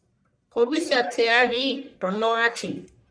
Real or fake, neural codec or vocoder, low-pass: fake; codec, 44.1 kHz, 1.7 kbps, Pupu-Codec; 9.9 kHz